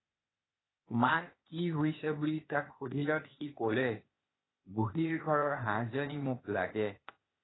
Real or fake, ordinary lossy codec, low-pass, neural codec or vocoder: fake; AAC, 16 kbps; 7.2 kHz; codec, 16 kHz, 0.8 kbps, ZipCodec